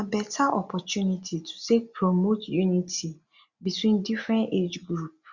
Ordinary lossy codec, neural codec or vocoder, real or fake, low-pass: Opus, 64 kbps; none; real; 7.2 kHz